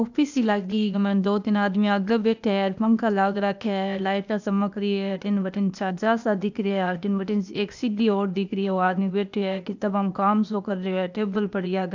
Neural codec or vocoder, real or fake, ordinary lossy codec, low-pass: codec, 16 kHz, 0.8 kbps, ZipCodec; fake; none; 7.2 kHz